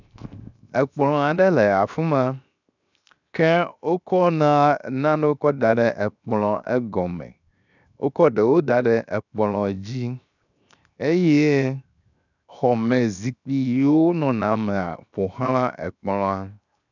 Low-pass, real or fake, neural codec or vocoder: 7.2 kHz; fake; codec, 16 kHz, 0.7 kbps, FocalCodec